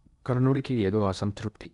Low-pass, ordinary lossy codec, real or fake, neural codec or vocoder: 10.8 kHz; none; fake; codec, 16 kHz in and 24 kHz out, 0.8 kbps, FocalCodec, streaming, 65536 codes